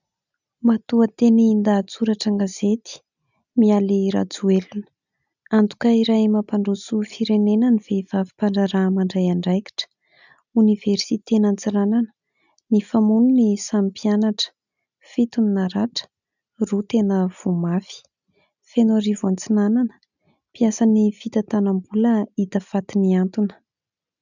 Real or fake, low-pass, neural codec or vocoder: real; 7.2 kHz; none